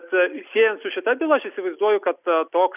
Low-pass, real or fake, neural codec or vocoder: 3.6 kHz; real; none